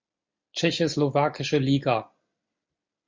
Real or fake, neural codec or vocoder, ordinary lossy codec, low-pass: real; none; MP3, 48 kbps; 7.2 kHz